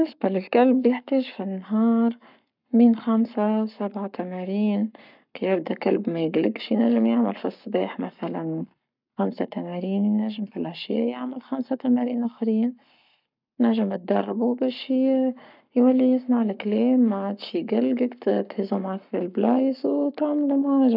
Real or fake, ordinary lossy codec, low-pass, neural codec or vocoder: fake; none; 5.4 kHz; codec, 44.1 kHz, 7.8 kbps, Pupu-Codec